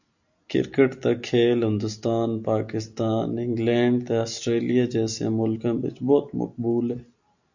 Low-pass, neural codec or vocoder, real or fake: 7.2 kHz; none; real